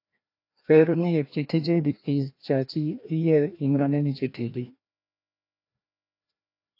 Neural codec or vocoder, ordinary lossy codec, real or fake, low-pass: codec, 16 kHz, 1 kbps, FreqCodec, larger model; AAC, 32 kbps; fake; 5.4 kHz